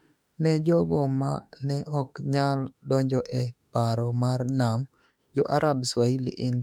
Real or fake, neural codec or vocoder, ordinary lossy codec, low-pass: fake; autoencoder, 48 kHz, 32 numbers a frame, DAC-VAE, trained on Japanese speech; none; 19.8 kHz